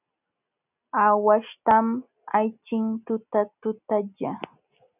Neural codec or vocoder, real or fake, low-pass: none; real; 3.6 kHz